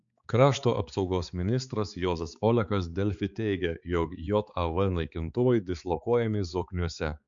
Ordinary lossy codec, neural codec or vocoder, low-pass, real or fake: MP3, 64 kbps; codec, 16 kHz, 4 kbps, X-Codec, HuBERT features, trained on balanced general audio; 7.2 kHz; fake